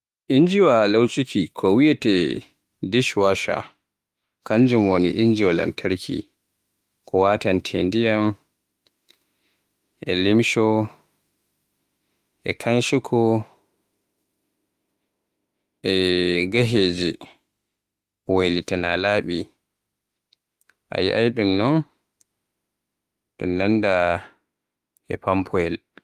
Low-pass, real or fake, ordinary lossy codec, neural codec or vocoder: 14.4 kHz; fake; Opus, 32 kbps; autoencoder, 48 kHz, 32 numbers a frame, DAC-VAE, trained on Japanese speech